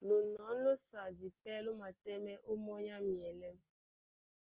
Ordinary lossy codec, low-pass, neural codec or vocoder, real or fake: Opus, 16 kbps; 3.6 kHz; none; real